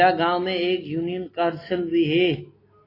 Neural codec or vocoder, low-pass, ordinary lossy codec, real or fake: none; 5.4 kHz; AAC, 24 kbps; real